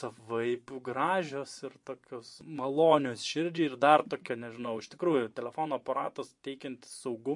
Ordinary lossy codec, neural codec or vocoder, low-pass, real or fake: MP3, 48 kbps; vocoder, 24 kHz, 100 mel bands, Vocos; 10.8 kHz; fake